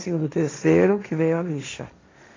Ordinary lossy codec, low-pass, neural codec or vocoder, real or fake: AAC, 32 kbps; 7.2 kHz; codec, 16 kHz, 1.1 kbps, Voila-Tokenizer; fake